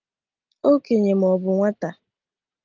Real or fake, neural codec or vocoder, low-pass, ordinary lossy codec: real; none; 7.2 kHz; Opus, 24 kbps